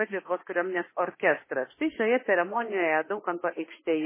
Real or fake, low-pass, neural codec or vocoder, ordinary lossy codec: fake; 3.6 kHz; autoencoder, 48 kHz, 32 numbers a frame, DAC-VAE, trained on Japanese speech; MP3, 16 kbps